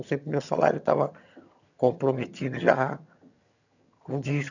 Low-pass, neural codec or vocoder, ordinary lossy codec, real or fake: 7.2 kHz; vocoder, 22.05 kHz, 80 mel bands, HiFi-GAN; none; fake